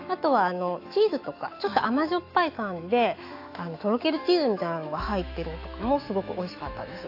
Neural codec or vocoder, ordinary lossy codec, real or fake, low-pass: autoencoder, 48 kHz, 128 numbers a frame, DAC-VAE, trained on Japanese speech; AAC, 32 kbps; fake; 5.4 kHz